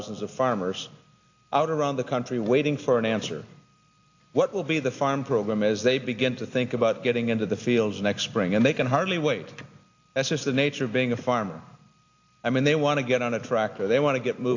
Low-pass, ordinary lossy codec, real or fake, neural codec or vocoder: 7.2 kHz; AAC, 48 kbps; real; none